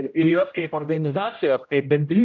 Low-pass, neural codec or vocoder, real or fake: 7.2 kHz; codec, 16 kHz, 0.5 kbps, X-Codec, HuBERT features, trained on general audio; fake